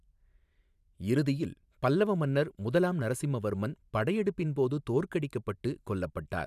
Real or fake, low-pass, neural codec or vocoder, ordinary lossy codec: fake; 14.4 kHz; vocoder, 44.1 kHz, 128 mel bands every 512 samples, BigVGAN v2; none